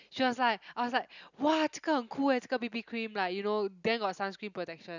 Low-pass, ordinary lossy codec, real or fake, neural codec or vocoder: 7.2 kHz; none; real; none